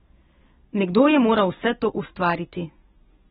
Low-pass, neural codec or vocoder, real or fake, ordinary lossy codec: 19.8 kHz; none; real; AAC, 16 kbps